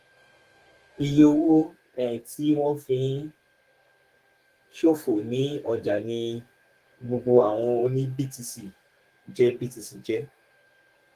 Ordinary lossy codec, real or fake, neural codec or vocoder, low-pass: Opus, 24 kbps; fake; codec, 32 kHz, 1.9 kbps, SNAC; 14.4 kHz